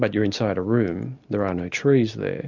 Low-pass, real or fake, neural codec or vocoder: 7.2 kHz; real; none